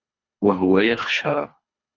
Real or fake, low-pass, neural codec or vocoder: fake; 7.2 kHz; codec, 24 kHz, 3 kbps, HILCodec